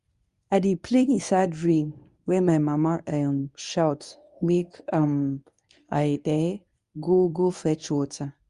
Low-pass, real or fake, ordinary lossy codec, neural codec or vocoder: 10.8 kHz; fake; Opus, 64 kbps; codec, 24 kHz, 0.9 kbps, WavTokenizer, medium speech release version 1